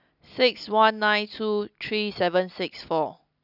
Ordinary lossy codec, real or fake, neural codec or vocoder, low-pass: none; real; none; 5.4 kHz